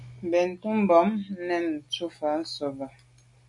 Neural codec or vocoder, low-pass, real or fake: none; 10.8 kHz; real